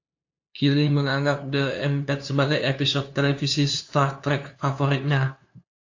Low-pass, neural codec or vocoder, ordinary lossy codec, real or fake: 7.2 kHz; codec, 16 kHz, 2 kbps, FunCodec, trained on LibriTTS, 25 frames a second; AAC, 48 kbps; fake